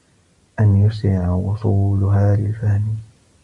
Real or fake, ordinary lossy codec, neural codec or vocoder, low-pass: real; Opus, 64 kbps; none; 10.8 kHz